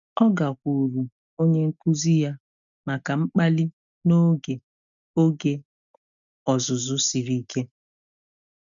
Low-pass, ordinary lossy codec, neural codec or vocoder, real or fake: 7.2 kHz; none; none; real